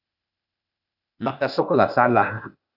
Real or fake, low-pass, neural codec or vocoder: fake; 5.4 kHz; codec, 16 kHz, 0.8 kbps, ZipCodec